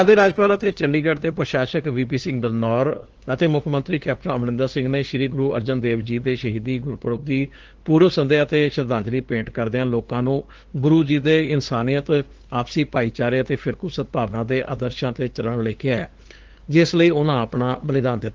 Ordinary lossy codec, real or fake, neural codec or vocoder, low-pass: Opus, 16 kbps; fake; codec, 16 kHz, 2 kbps, FunCodec, trained on LibriTTS, 25 frames a second; 7.2 kHz